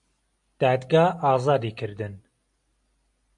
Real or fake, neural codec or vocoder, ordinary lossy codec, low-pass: real; none; AAC, 64 kbps; 10.8 kHz